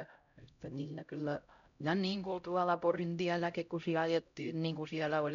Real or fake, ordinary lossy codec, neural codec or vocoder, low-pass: fake; MP3, 64 kbps; codec, 16 kHz, 0.5 kbps, X-Codec, HuBERT features, trained on LibriSpeech; 7.2 kHz